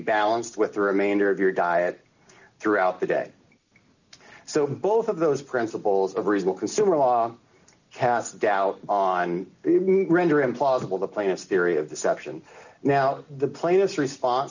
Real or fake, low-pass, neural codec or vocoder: real; 7.2 kHz; none